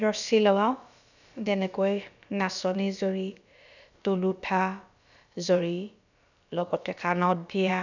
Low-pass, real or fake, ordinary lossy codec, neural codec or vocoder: 7.2 kHz; fake; none; codec, 16 kHz, about 1 kbps, DyCAST, with the encoder's durations